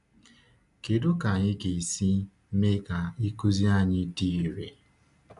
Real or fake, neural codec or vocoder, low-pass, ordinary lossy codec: real; none; 10.8 kHz; none